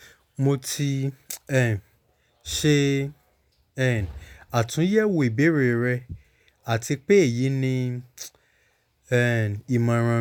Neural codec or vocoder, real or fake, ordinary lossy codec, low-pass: none; real; none; 19.8 kHz